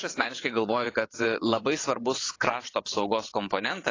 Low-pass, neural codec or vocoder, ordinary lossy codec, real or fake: 7.2 kHz; vocoder, 22.05 kHz, 80 mel bands, WaveNeXt; AAC, 32 kbps; fake